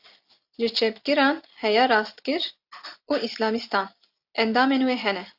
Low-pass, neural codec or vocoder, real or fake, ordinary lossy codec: 5.4 kHz; none; real; AAC, 48 kbps